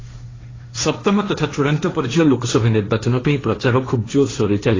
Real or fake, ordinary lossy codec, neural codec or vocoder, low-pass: fake; AAC, 32 kbps; codec, 16 kHz, 1.1 kbps, Voila-Tokenizer; 7.2 kHz